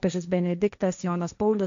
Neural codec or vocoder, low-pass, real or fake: codec, 16 kHz, 1.1 kbps, Voila-Tokenizer; 7.2 kHz; fake